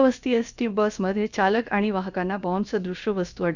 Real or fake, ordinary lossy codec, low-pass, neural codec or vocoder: fake; none; 7.2 kHz; codec, 16 kHz, about 1 kbps, DyCAST, with the encoder's durations